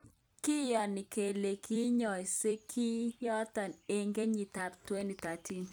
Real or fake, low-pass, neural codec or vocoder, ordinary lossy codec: fake; none; vocoder, 44.1 kHz, 128 mel bands every 256 samples, BigVGAN v2; none